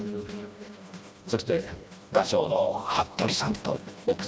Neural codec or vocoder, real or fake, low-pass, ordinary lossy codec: codec, 16 kHz, 1 kbps, FreqCodec, smaller model; fake; none; none